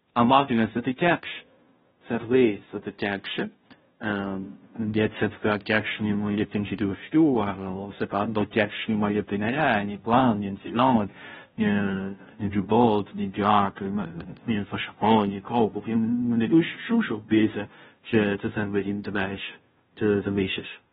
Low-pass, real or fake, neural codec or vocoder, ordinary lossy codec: 7.2 kHz; fake; codec, 16 kHz, 0.5 kbps, FunCodec, trained on Chinese and English, 25 frames a second; AAC, 16 kbps